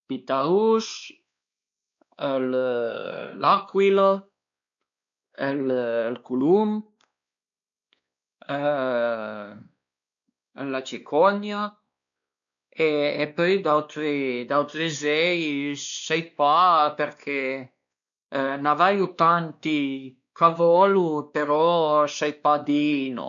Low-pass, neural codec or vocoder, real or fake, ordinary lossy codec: 7.2 kHz; codec, 16 kHz, 2 kbps, X-Codec, WavLM features, trained on Multilingual LibriSpeech; fake; none